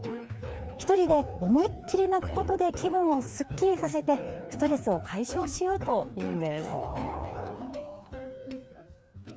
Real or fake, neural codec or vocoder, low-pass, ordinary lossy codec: fake; codec, 16 kHz, 2 kbps, FreqCodec, larger model; none; none